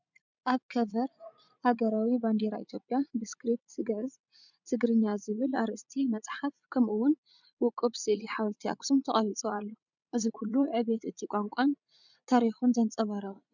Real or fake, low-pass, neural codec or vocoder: fake; 7.2 kHz; vocoder, 44.1 kHz, 80 mel bands, Vocos